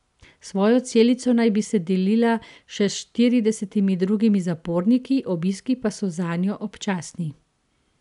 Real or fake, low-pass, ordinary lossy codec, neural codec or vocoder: real; 10.8 kHz; none; none